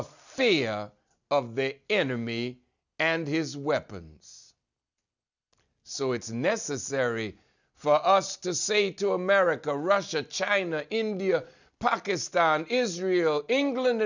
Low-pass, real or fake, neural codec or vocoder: 7.2 kHz; real; none